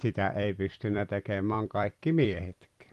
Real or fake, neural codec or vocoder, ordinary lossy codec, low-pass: fake; vocoder, 44.1 kHz, 128 mel bands every 256 samples, BigVGAN v2; Opus, 24 kbps; 14.4 kHz